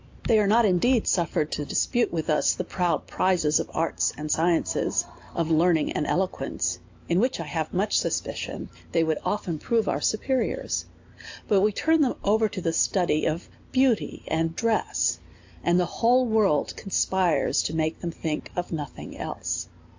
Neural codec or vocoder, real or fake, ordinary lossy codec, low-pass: none; real; AAC, 48 kbps; 7.2 kHz